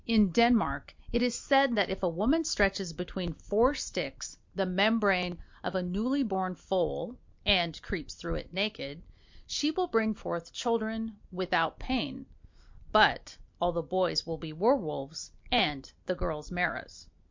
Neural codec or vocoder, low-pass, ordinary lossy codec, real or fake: none; 7.2 kHz; MP3, 48 kbps; real